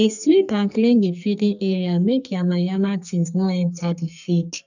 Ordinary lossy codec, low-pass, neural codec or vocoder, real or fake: none; 7.2 kHz; codec, 44.1 kHz, 2.6 kbps, SNAC; fake